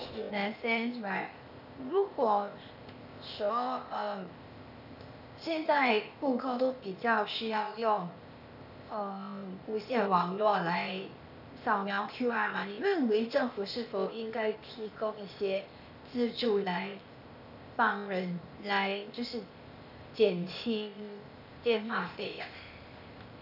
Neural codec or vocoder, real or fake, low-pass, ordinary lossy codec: codec, 16 kHz, 0.8 kbps, ZipCodec; fake; 5.4 kHz; none